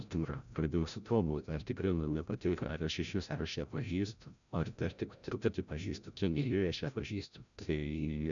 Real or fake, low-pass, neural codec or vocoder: fake; 7.2 kHz; codec, 16 kHz, 0.5 kbps, FreqCodec, larger model